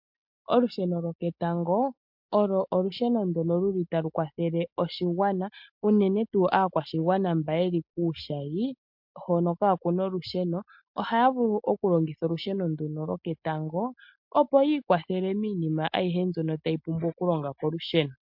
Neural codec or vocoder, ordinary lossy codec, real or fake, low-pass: none; MP3, 48 kbps; real; 5.4 kHz